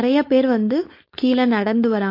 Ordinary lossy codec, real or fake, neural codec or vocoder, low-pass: MP3, 32 kbps; fake; codec, 16 kHz, 4.8 kbps, FACodec; 5.4 kHz